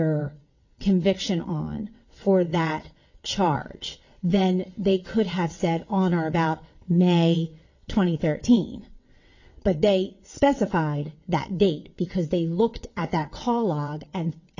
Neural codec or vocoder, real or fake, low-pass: vocoder, 22.05 kHz, 80 mel bands, WaveNeXt; fake; 7.2 kHz